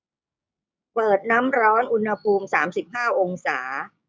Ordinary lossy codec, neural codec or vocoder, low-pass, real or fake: none; codec, 16 kHz, 6 kbps, DAC; none; fake